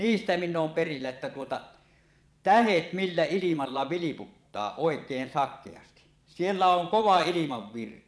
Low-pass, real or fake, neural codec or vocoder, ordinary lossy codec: none; fake; vocoder, 22.05 kHz, 80 mel bands, Vocos; none